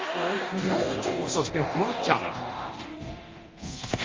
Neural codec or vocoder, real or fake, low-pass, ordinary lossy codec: codec, 24 kHz, 0.9 kbps, DualCodec; fake; 7.2 kHz; Opus, 32 kbps